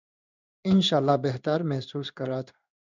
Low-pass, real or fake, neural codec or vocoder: 7.2 kHz; fake; codec, 16 kHz in and 24 kHz out, 1 kbps, XY-Tokenizer